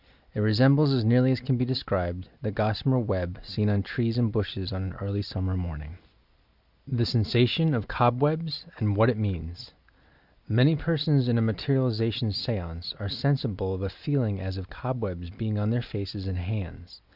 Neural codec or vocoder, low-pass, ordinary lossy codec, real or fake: none; 5.4 kHz; Opus, 64 kbps; real